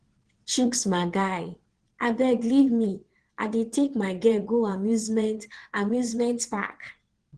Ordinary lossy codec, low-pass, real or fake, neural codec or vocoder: Opus, 16 kbps; 9.9 kHz; fake; vocoder, 22.05 kHz, 80 mel bands, WaveNeXt